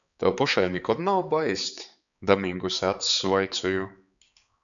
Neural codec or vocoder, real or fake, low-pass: codec, 16 kHz, 4 kbps, X-Codec, HuBERT features, trained on balanced general audio; fake; 7.2 kHz